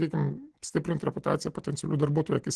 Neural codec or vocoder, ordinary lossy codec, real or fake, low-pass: none; Opus, 16 kbps; real; 10.8 kHz